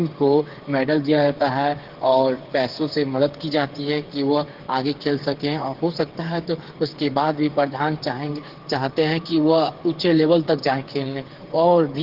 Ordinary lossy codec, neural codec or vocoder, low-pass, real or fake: Opus, 16 kbps; codec, 16 kHz, 8 kbps, FreqCodec, smaller model; 5.4 kHz; fake